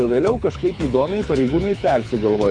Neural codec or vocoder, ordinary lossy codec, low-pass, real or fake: codec, 24 kHz, 6 kbps, HILCodec; AAC, 64 kbps; 9.9 kHz; fake